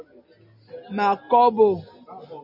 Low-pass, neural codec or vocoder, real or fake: 5.4 kHz; none; real